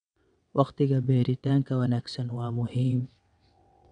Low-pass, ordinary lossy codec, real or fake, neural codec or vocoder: 9.9 kHz; none; fake; vocoder, 22.05 kHz, 80 mel bands, WaveNeXt